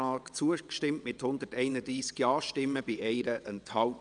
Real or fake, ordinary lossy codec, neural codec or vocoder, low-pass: fake; none; vocoder, 22.05 kHz, 80 mel bands, WaveNeXt; 9.9 kHz